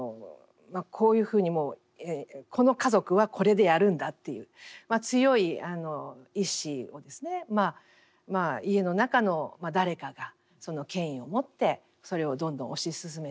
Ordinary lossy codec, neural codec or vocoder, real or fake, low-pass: none; none; real; none